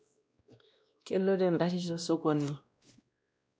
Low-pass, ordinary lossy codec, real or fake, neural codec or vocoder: none; none; fake; codec, 16 kHz, 1 kbps, X-Codec, WavLM features, trained on Multilingual LibriSpeech